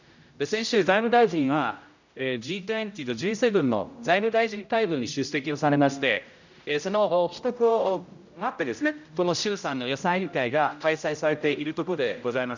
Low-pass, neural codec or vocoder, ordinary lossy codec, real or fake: 7.2 kHz; codec, 16 kHz, 0.5 kbps, X-Codec, HuBERT features, trained on general audio; none; fake